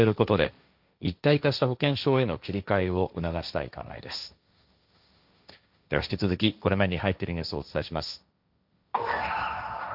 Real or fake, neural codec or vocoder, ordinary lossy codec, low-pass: fake; codec, 16 kHz, 1.1 kbps, Voila-Tokenizer; none; 5.4 kHz